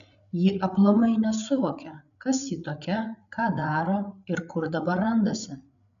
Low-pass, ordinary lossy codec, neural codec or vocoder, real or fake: 7.2 kHz; MP3, 96 kbps; codec, 16 kHz, 8 kbps, FreqCodec, larger model; fake